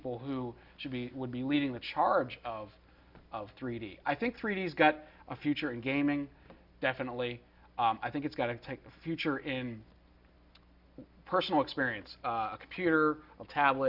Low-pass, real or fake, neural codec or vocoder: 5.4 kHz; real; none